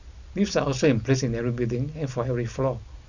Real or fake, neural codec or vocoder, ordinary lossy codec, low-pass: real; none; none; 7.2 kHz